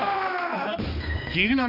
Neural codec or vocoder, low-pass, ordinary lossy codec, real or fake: codec, 16 kHz, 2 kbps, X-Codec, HuBERT features, trained on balanced general audio; 5.4 kHz; none; fake